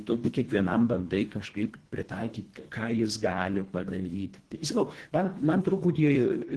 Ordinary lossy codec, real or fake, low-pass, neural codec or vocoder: Opus, 16 kbps; fake; 10.8 kHz; codec, 24 kHz, 1.5 kbps, HILCodec